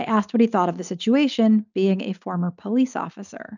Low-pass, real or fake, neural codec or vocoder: 7.2 kHz; real; none